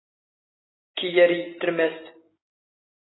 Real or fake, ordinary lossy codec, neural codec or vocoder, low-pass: real; AAC, 16 kbps; none; 7.2 kHz